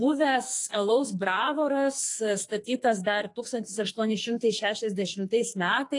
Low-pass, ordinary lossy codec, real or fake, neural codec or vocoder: 10.8 kHz; AAC, 48 kbps; fake; codec, 44.1 kHz, 2.6 kbps, SNAC